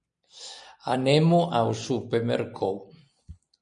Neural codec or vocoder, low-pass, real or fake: none; 9.9 kHz; real